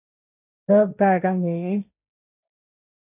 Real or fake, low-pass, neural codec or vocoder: fake; 3.6 kHz; codec, 16 kHz, 1.1 kbps, Voila-Tokenizer